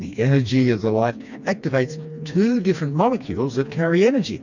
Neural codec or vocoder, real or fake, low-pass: codec, 16 kHz, 2 kbps, FreqCodec, smaller model; fake; 7.2 kHz